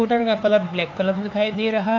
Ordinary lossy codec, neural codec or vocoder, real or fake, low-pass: AAC, 48 kbps; codec, 16 kHz, 4 kbps, X-Codec, HuBERT features, trained on LibriSpeech; fake; 7.2 kHz